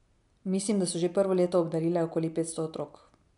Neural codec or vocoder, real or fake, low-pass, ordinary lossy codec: none; real; 10.8 kHz; none